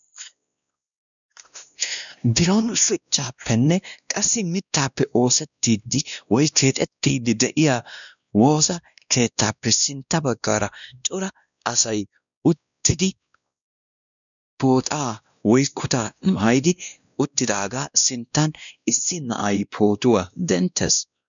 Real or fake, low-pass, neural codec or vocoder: fake; 7.2 kHz; codec, 16 kHz, 1 kbps, X-Codec, WavLM features, trained on Multilingual LibriSpeech